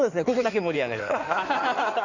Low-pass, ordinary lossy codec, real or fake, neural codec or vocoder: 7.2 kHz; none; fake; codec, 16 kHz in and 24 kHz out, 2.2 kbps, FireRedTTS-2 codec